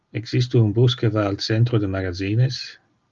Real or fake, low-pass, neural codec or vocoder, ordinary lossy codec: real; 7.2 kHz; none; Opus, 32 kbps